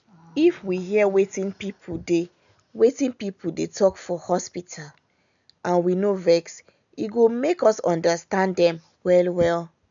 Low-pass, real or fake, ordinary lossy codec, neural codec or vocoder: 7.2 kHz; real; none; none